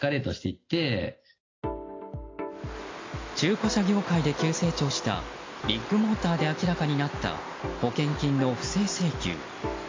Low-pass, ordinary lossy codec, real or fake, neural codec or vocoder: 7.2 kHz; AAC, 32 kbps; real; none